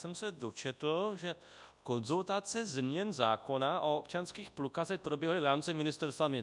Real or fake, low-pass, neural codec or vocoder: fake; 10.8 kHz; codec, 24 kHz, 0.9 kbps, WavTokenizer, large speech release